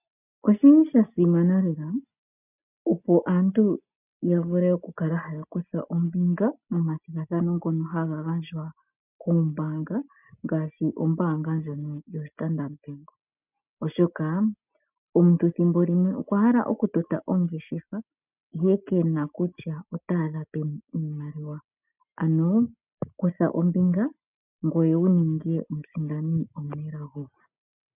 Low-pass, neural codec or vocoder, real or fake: 3.6 kHz; none; real